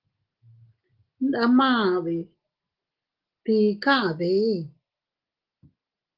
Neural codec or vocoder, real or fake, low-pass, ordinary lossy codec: none; real; 5.4 kHz; Opus, 16 kbps